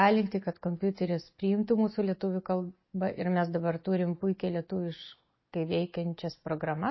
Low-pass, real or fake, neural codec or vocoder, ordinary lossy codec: 7.2 kHz; real; none; MP3, 24 kbps